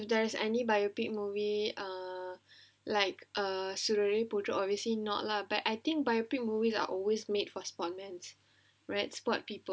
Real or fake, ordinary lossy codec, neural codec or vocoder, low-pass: real; none; none; none